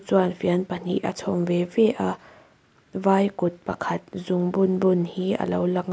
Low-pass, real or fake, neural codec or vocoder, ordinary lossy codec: none; real; none; none